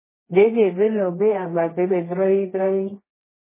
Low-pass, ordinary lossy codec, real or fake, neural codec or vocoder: 3.6 kHz; MP3, 16 kbps; fake; codec, 24 kHz, 0.9 kbps, WavTokenizer, medium music audio release